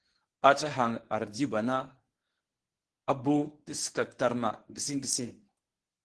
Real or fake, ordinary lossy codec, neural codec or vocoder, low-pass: fake; Opus, 16 kbps; codec, 24 kHz, 0.9 kbps, WavTokenizer, medium speech release version 1; 10.8 kHz